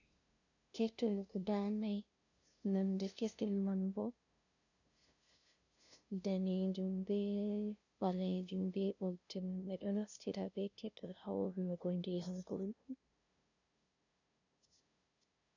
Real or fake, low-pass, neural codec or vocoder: fake; 7.2 kHz; codec, 16 kHz, 0.5 kbps, FunCodec, trained on LibriTTS, 25 frames a second